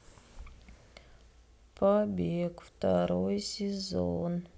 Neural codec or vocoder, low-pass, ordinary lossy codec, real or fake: none; none; none; real